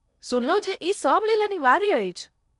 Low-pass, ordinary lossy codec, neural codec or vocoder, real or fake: 10.8 kHz; none; codec, 16 kHz in and 24 kHz out, 0.8 kbps, FocalCodec, streaming, 65536 codes; fake